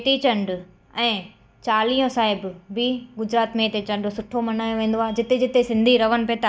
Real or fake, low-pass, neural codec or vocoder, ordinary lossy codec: real; none; none; none